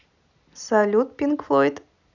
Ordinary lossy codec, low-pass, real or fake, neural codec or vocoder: none; 7.2 kHz; real; none